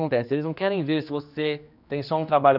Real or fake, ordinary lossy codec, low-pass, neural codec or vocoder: fake; none; 5.4 kHz; codec, 16 kHz, 2 kbps, X-Codec, HuBERT features, trained on general audio